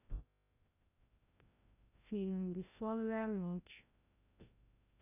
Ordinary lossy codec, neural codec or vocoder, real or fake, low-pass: none; codec, 16 kHz, 0.5 kbps, FreqCodec, larger model; fake; 3.6 kHz